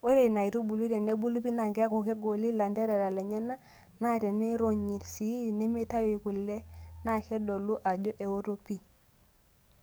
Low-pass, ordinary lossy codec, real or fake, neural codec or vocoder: none; none; fake; codec, 44.1 kHz, 7.8 kbps, DAC